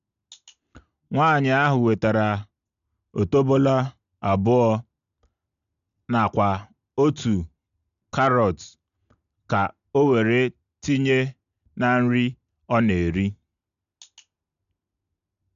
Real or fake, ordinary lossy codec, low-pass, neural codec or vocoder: real; none; 7.2 kHz; none